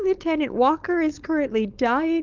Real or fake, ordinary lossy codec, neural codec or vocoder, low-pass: fake; Opus, 32 kbps; codec, 16 kHz, 4.8 kbps, FACodec; 7.2 kHz